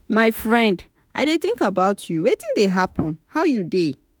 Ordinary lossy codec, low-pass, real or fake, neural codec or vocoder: none; none; fake; autoencoder, 48 kHz, 32 numbers a frame, DAC-VAE, trained on Japanese speech